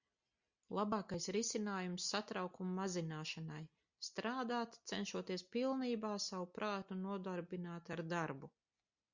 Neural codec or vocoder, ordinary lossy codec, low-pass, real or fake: none; Opus, 64 kbps; 7.2 kHz; real